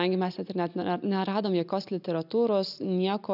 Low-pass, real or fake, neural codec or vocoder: 5.4 kHz; real; none